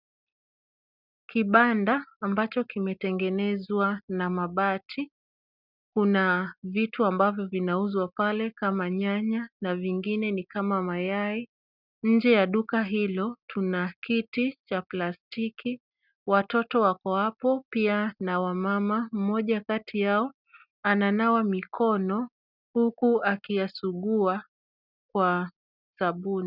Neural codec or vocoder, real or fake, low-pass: none; real; 5.4 kHz